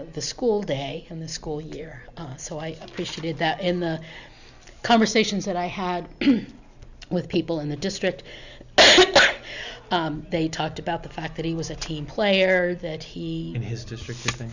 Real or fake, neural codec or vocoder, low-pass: real; none; 7.2 kHz